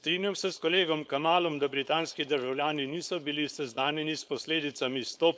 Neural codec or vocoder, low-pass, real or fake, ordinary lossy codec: codec, 16 kHz, 4.8 kbps, FACodec; none; fake; none